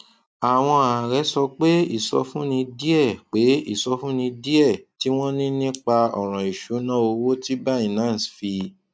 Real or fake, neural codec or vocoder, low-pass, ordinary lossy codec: real; none; none; none